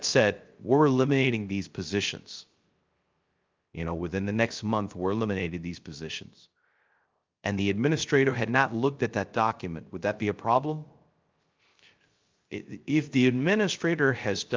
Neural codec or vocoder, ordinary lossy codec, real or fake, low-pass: codec, 16 kHz, 0.3 kbps, FocalCodec; Opus, 24 kbps; fake; 7.2 kHz